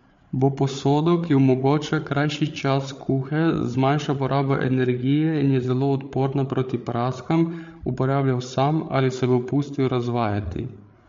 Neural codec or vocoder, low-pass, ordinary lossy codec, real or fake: codec, 16 kHz, 8 kbps, FreqCodec, larger model; 7.2 kHz; MP3, 48 kbps; fake